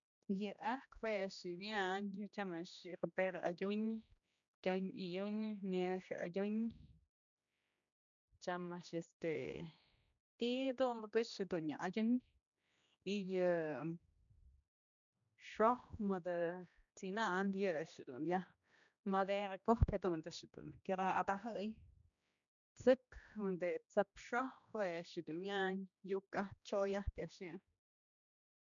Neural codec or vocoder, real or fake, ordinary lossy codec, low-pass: codec, 16 kHz, 1 kbps, X-Codec, HuBERT features, trained on general audio; fake; none; 7.2 kHz